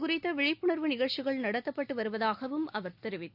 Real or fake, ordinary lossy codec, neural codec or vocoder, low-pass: real; none; none; 5.4 kHz